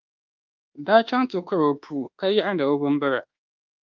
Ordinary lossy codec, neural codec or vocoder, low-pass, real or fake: Opus, 32 kbps; codec, 24 kHz, 1.2 kbps, DualCodec; 7.2 kHz; fake